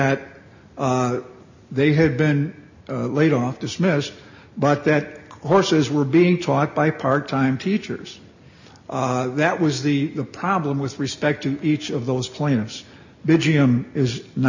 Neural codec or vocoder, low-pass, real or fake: none; 7.2 kHz; real